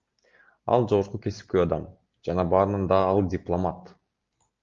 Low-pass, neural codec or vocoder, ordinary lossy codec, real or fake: 7.2 kHz; none; Opus, 32 kbps; real